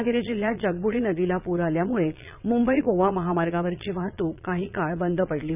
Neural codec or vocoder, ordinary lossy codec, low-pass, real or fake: vocoder, 22.05 kHz, 80 mel bands, Vocos; none; 3.6 kHz; fake